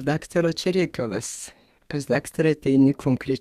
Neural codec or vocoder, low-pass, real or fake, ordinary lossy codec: codec, 32 kHz, 1.9 kbps, SNAC; 14.4 kHz; fake; Opus, 64 kbps